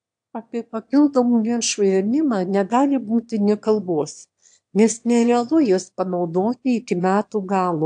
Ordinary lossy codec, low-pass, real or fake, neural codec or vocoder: AAC, 64 kbps; 9.9 kHz; fake; autoencoder, 22.05 kHz, a latent of 192 numbers a frame, VITS, trained on one speaker